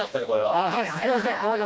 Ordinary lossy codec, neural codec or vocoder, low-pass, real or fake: none; codec, 16 kHz, 1 kbps, FreqCodec, smaller model; none; fake